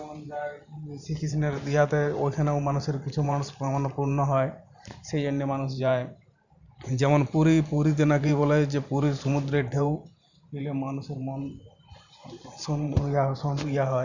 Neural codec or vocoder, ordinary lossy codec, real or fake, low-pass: vocoder, 44.1 kHz, 128 mel bands every 512 samples, BigVGAN v2; none; fake; 7.2 kHz